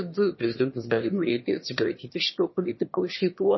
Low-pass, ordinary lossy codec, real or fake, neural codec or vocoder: 7.2 kHz; MP3, 24 kbps; fake; autoencoder, 22.05 kHz, a latent of 192 numbers a frame, VITS, trained on one speaker